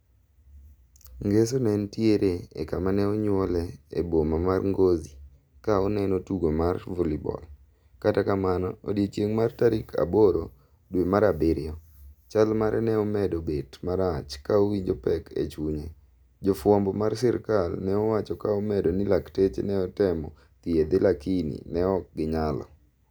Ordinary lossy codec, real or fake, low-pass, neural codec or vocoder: none; real; none; none